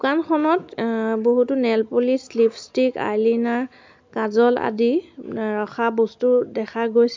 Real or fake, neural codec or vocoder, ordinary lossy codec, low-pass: real; none; MP3, 64 kbps; 7.2 kHz